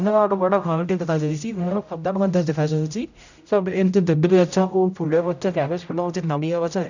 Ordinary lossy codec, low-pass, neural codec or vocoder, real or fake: none; 7.2 kHz; codec, 16 kHz, 0.5 kbps, X-Codec, HuBERT features, trained on general audio; fake